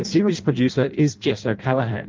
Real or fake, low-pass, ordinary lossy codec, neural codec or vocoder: fake; 7.2 kHz; Opus, 32 kbps; codec, 16 kHz in and 24 kHz out, 0.6 kbps, FireRedTTS-2 codec